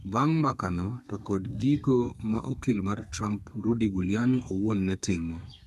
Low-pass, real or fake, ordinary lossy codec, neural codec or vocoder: 14.4 kHz; fake; none; codec, 32 kHz, 1.9 kbps, SNAC